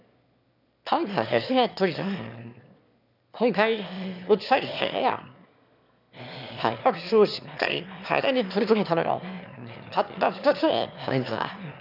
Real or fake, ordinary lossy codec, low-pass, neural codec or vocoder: fake; none; 5.4 kHz; autoencoder, 22.05 kHz, a latent of 192 numbers a frame, VITS, trained on one speaker